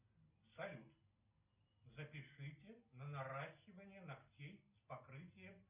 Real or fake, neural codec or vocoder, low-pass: real; none; 3.6 kHz